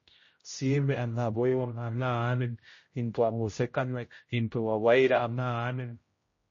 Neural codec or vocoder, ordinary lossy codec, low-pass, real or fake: codec, 16 kHz, 0.5 kbps, X-Codec, HuBERT features, trained on general audio; MP3, 32 kbps; 7.2 kHz; fake